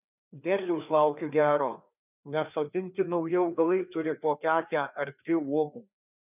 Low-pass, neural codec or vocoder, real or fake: 3.6 kHz; codec, 16 kHz, 2 kbps, FreqCodec, larger model; fake